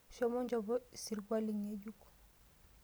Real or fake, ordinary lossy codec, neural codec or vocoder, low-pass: real; none; none; none